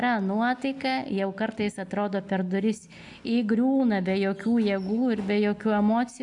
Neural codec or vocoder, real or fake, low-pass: none; real; 10.8 kHz